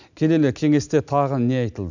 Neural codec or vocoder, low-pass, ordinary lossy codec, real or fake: none; 7.2 kHz; none; real